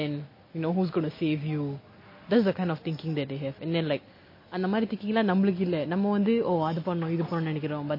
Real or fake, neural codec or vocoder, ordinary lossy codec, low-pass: real; none; MP3, 24 kbps; 5.4 kHz